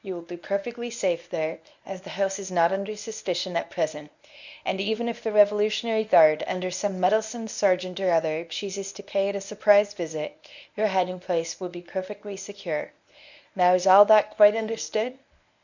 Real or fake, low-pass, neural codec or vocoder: fake; 7.2 kHz; codec, 24 kHz, 0.9 kbps, WavTokenizer, medium speech release version 1